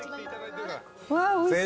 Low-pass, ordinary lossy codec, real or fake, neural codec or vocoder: none; none; real; none